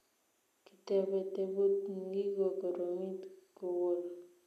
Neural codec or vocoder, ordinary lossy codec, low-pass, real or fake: none; none; 14.4 kHz; real